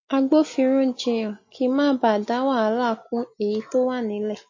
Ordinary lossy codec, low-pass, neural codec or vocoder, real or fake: MP3, 32 kbps; 7.2 kHz; none; real